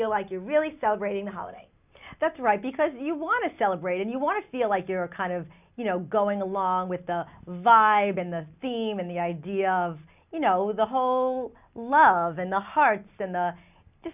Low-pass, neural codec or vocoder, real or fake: 3.6 kHz; none; real